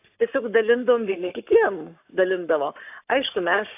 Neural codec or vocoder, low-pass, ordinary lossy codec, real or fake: codec, 44.1 kHz, 7.8 kbps, Pupu-Codec; 3.6 kHz; AAC, 32 kbps; fake